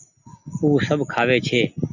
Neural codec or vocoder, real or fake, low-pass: none; real; 7.2 kHz